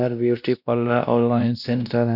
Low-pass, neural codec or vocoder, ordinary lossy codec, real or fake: 5.4 kHz; codec, 16 kHz, 1 kbps, X-Codec, WavLM features, trained on Multilingual LibriSpeech; none; fake